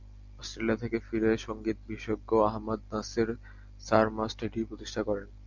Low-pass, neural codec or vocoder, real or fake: 7.2 kHz; none; real